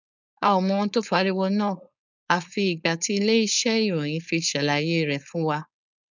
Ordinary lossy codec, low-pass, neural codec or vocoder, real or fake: none; 7.2 kHz; codec, 16 kHz, 4.8 kbps, FACodec; fake